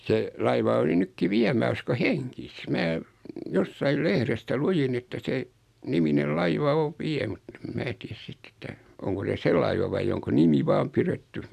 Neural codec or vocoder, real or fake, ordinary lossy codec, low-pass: none; real; AAC, 96 kbps; 14.4 kHz